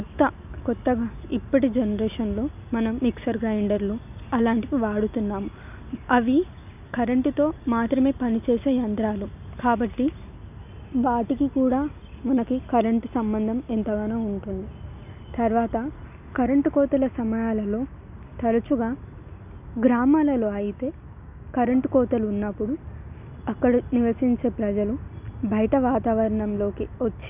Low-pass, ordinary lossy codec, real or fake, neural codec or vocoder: 3.6 kHz; none; real; none